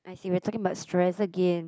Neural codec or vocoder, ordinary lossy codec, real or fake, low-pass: none; none; real; none